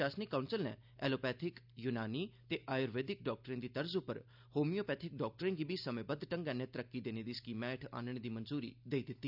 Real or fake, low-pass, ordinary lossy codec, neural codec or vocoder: real; 5.4 kHz; none; none